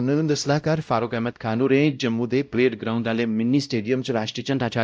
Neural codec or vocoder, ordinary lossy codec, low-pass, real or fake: codec, 16 kHz, 0.5 kbps, X-Codec, WavLM features, trained on Multilingual LibriSpeech; none; none; fake